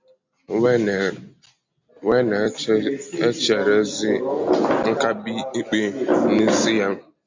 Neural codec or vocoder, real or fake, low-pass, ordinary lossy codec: none; real; 7.2 kHz; MP3, 48 kbps